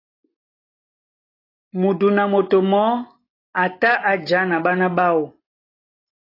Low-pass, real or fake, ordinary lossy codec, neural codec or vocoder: 5.4 kHz; real; AAC, 24 kbps; none